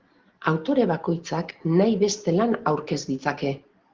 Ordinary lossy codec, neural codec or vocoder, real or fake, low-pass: Opus, 16 kbps; none; real; 7.2 kHz